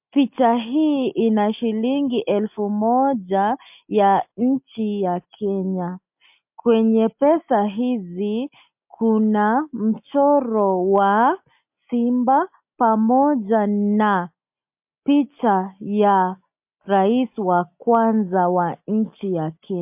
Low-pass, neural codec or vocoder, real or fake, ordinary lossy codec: 3.6 kHz; none; real; MP3, 32 kbps